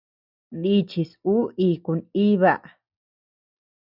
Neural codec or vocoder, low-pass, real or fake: none; 5.4 kHz; real